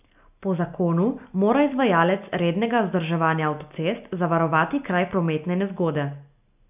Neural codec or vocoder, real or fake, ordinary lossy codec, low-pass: none; real; AAC, 32 kbps; 3.6 kHz